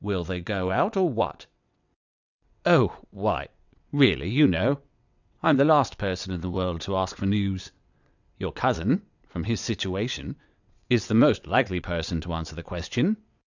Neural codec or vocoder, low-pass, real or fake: vocoder, 22.05 kHz, 80 mel bands, WaveNeXt; 7.2 kHz; fake